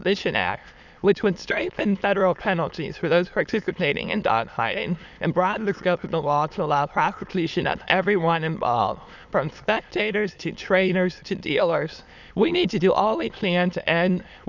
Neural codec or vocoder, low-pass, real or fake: autoencoder, 22.05 kHz, a latent of 192 numbers a frame, VITS, trained on many speakers; 7.2 kHz; fake